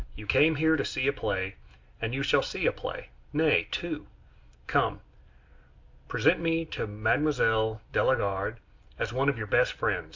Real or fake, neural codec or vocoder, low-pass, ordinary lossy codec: real; none; 7.2 kHz; MP3, 64 kbps